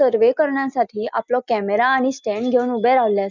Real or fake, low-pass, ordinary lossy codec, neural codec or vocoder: real; 7.2 kHz; none; none